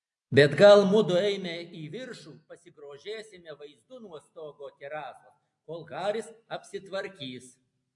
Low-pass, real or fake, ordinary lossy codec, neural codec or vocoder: 10.8 kHz; real; AAC, 64 kbps; none